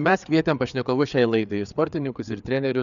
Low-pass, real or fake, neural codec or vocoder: 7.2 kHz; fake; codec, 16 kHz, 4 kbps, FreqCodec, larger model